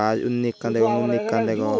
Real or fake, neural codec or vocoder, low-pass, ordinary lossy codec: real; none; none; none